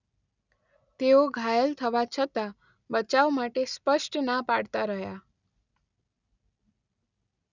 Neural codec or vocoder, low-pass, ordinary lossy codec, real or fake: none; 7.2 kHz; none; real